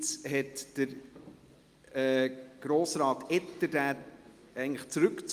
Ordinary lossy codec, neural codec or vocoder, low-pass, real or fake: Opus, 32 kbps; none; 14.4 kHz; real